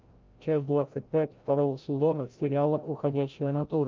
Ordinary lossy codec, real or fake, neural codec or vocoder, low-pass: Opus, 24 kbps; fake; codec, 16 kHz, 0.5 kbps, FreqCodec, larger model; 7.2 kHz